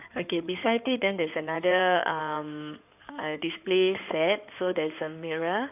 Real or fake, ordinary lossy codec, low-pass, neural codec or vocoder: fake; none; 3.6 kHz; codec, 16 kHz in and 24 kHz out, 2.2 kbps, FireRedTTS-2 codec